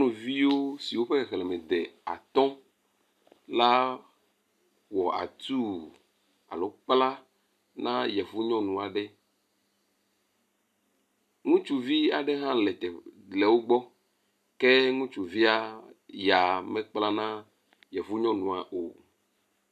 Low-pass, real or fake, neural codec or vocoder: 14.4 kHz; real; none